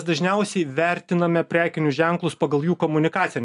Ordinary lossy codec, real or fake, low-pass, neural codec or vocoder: AAC, 96 kbps; real; 10.8 kHz; none